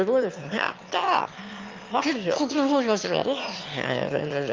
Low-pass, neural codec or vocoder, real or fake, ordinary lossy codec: 7.2 kHz; autoencoder, 22.05 kHz, a latent of 192 numbers a frame, VITS, trained on one speaker; fake; Opus, 24 kbps